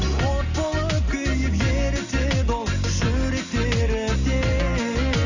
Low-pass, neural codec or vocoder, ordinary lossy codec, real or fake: 7.2 kHz; none; none; real